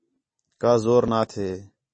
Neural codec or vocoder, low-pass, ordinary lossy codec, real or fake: none; 9.9 kHz; MP3, 32 kbps; real